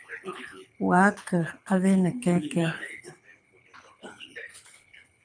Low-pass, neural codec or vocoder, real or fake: 9.9 kHz; codec, 24 kHz, 6 kbps, HILCodec; fake